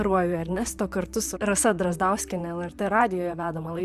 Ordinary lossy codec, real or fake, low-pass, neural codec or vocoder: Opus, 64 kbps; fake; 14.4 kHz; vocoder, 44.1 kHz, 128 mel bands, Pupu-Vocoder